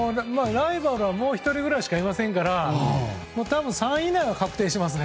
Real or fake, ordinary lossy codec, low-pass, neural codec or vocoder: real; none; none; none